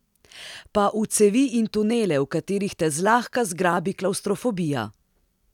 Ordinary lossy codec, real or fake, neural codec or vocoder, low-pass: none; real; none; 19.8 kHz